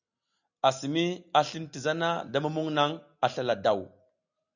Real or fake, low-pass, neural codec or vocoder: real; 7.2 kHz; none